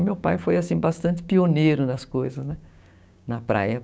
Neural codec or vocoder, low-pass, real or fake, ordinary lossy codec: codec, 16 kHz, 6 kbps, DAC; none; fake; none